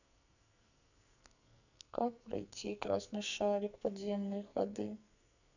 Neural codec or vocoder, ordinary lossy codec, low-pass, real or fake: codec, 44.1 kHz, 2.6 kbps, SNAC; none; 7.2 kHz; fake